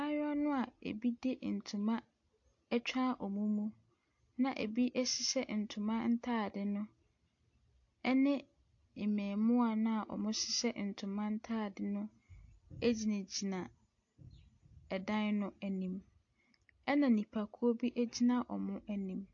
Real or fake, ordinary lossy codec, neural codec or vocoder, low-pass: real; AAC, 48 kbps; none; 7.2 kHz